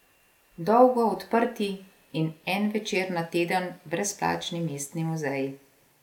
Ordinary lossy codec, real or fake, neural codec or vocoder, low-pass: none; real; none; 19.8 kHz